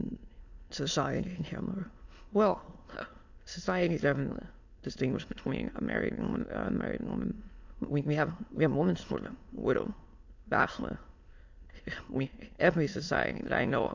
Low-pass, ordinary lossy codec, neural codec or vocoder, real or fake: 7.2 kHz; AAC, 48 kbps; autoencoder, 22.05 kHz, a latent of 192 numbers a frame, VITS, trained on many speakers; fake